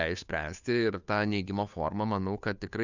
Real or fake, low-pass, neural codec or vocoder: fake; 7.2 kHz; codec, 16 kHz, 2 kbps, FunCodec, trained on Chinese and English, 25 frames a second